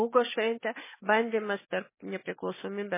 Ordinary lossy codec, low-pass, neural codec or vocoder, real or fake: MP3, 16 kbps; 3.6 kHz; none; real